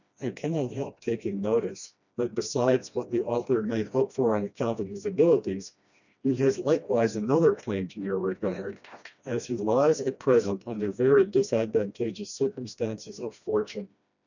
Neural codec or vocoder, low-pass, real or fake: codec, 16 kHz, 1 kbps, FreqCodec, smaller model; 7.2 kHz; fake